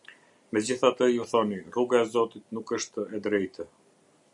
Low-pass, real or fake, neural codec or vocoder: 10.8 kHz; real; none